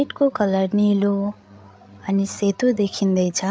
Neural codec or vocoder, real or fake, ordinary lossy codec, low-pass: codec, 16 kHz, 8 kbps, FreqCodec, larger model; fake; none; none